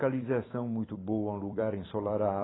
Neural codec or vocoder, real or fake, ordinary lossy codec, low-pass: vocoder, 44.1 kHz, 128 mel bands every 256 samples, BigVGAN v2; fake; AAC, 16 kbps; 7.2 kHz